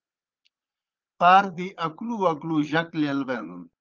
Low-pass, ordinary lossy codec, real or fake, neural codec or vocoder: 7.2 kHz; Opus, 32 kbps; fake; vocoder, 22.05 kHz, 80 mel bands, Vocos